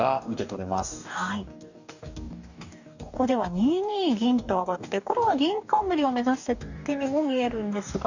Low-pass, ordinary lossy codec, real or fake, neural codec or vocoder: 7.2 kHz; none; fake; codec, 44.1 kHz, 2.6 kbps, DAC